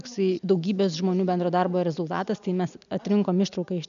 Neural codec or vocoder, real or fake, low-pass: none; real; 7.2 kHz